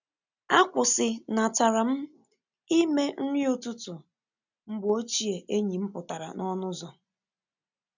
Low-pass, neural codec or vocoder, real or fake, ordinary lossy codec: 7.2 kHz; none; real; none